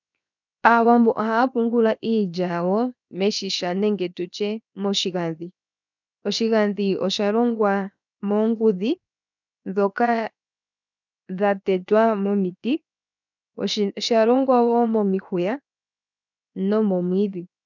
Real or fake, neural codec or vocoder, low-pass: fake; codec, 16 kHz, 0.7 kbps, FocalCodec; 7.2 kHz